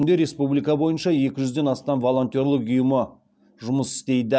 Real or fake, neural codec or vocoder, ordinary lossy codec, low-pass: real; none; none; none